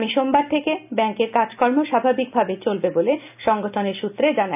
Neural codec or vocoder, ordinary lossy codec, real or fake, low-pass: none; none; real; 3.6 kHz